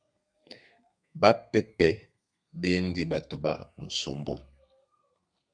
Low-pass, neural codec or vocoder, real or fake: 9.9 kHz; codec, 32 kHz, 1.9 kbps, SNAC; fake